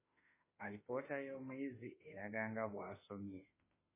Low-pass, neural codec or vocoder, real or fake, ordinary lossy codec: 3.6 kHz; autoencoder, 48 kHz, 32 numbers a frame, DAC-VAE, trained on Japanese speech; fake; MP3, 16 kbps